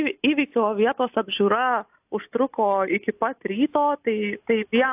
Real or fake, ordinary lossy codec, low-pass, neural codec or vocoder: real; AAC, 32 kbps; 3.6 kHz; none